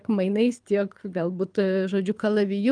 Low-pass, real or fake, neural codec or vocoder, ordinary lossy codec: 9.9 kHz; fake; codec, 24 kHz, 6 kbps, HILCodec; Opus, 32 kbps